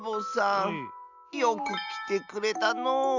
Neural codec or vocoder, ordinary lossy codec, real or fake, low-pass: none; Opus, 64 kbps; real; 7.2 kHz